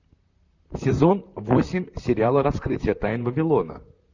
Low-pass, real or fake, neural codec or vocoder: 7.2 kHz; fake; vocoder, 44.1 kHz, 128 mel bands, Pupu-Vocoder